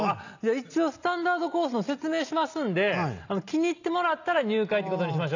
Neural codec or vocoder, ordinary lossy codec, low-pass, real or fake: none; none; 7.2 kHz; real